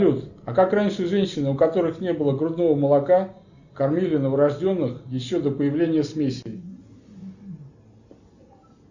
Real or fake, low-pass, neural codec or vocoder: real; 7.2 kHz; none